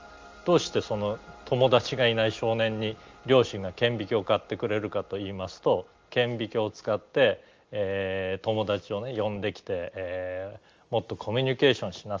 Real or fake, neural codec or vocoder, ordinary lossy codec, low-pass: real; none; Opus, 32 kbps; 7.2 kHz